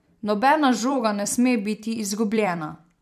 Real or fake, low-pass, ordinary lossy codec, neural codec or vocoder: fake; 14.4 kHz; MP3, 96 kbps; vocoder, 44.1 kHz, 128 mel bands every 256 samples, BigVGAN v2